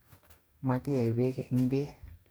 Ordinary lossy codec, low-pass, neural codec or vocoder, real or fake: none; none; codec, 44.1 kHz, 2.6 kbps, DAC; fake